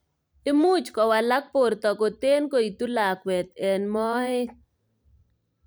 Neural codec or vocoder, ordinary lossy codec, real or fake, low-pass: vocoder, 44.1 kHz, 128 mel bands every 512 samples, BigVGAN v2; none; fake; none